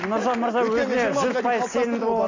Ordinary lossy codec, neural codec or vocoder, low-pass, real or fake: AAC, 32 kbps; none; 7.2 kHz; real